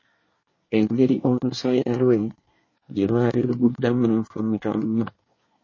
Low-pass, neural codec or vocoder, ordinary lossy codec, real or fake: 7.2 kHz; codec, 24 kHz, 1 kbps, SNAC; MP3, 32 kbps; fake